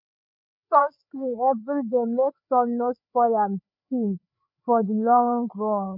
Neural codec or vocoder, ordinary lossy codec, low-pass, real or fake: codec, 16 kHz, 4 kbps, FreqCodec, larger model; MP3, 48 kbps; 5.4 kHz; fake